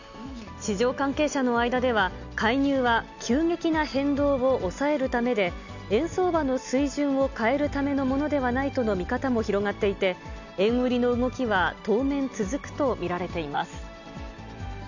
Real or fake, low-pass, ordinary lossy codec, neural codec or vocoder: real; 7.2 kHz; none; none